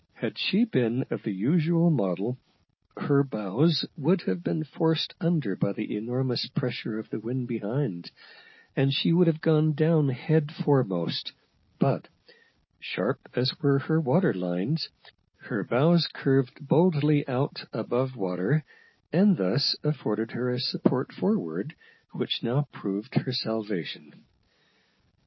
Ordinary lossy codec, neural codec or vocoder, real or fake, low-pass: MP3, 24 kbps; none; real; 7.2 kHz